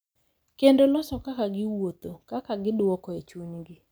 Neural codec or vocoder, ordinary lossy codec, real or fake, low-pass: none; none; real; none